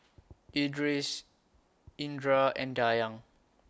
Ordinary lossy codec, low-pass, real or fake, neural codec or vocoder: none; none; real; none